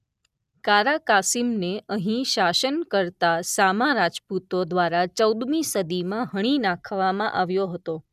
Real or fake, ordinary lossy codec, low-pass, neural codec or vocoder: real; none; 14.4 kHz; none